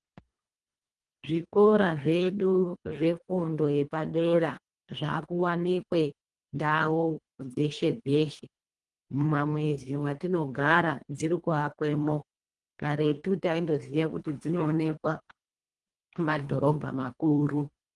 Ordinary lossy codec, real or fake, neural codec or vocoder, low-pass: Opus, 24 kbps; fake; codec, 24 kHz, 1.5 kbps, HILCodec; 10.8 kHz